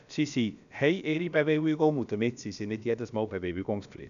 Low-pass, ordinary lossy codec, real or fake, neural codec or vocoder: 7.2 kHz; none; fake; codec, 16 kHz, about 1 kbps, DyCAST, with the encoder's durations